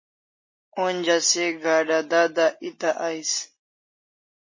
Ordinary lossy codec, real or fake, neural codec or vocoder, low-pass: MP3, 32 kbps; real; none; 7.2 kHz